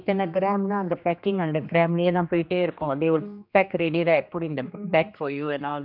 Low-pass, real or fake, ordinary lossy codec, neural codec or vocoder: 5.4 kHz; fake; none; codec, 16 kHz, 2 kbps, X-Codec, HuBERT features, trained on general audio